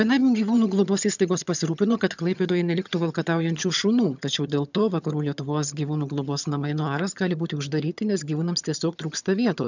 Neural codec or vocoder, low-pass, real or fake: vocoder, 22.05 kHz, 80 mel bands, HiFi-GAN; 7.2 kHz; fake